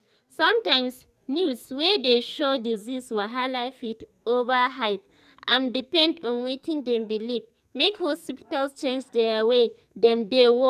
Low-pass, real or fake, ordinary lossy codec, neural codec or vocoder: 14.4 kHz; fake; none; codec, 44.1 kHz, 2.6 kbps, SNAC